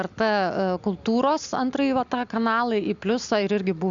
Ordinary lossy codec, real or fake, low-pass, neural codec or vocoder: Opus, 64 kbps; real; 7.2 kHz; none